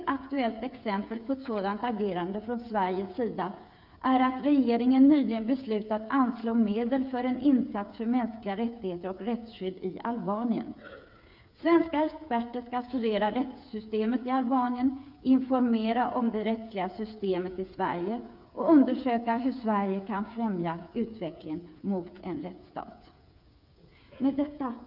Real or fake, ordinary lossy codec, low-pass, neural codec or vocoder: fake; none; 5.4 kHz; codec, 16 kHz, 8 kbps, FreqCodec, smaller model